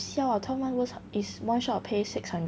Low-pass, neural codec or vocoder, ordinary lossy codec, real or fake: none; none; none; real